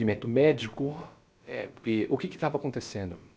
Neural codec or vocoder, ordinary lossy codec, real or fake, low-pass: codec, 16 kHz, about 1 kbps, DyCAST, with the encoder's durations; none; fake; none